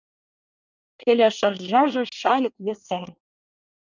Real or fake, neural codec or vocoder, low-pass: fake; codec, 24 kHz, 1 kbps, SNAC; 7.2 kHz